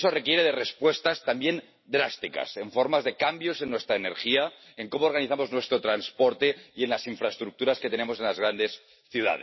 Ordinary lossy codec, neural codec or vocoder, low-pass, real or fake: MP3, 24 kbps; none; 7.2 kHz; real